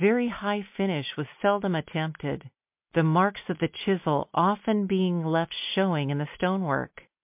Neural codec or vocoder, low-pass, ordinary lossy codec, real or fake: none; 3.6 kHz; MP3, 32 kbps; real